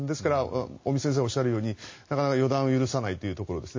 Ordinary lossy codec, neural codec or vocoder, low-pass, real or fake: MP3, 32 kbps; none; 7.2 kHz; real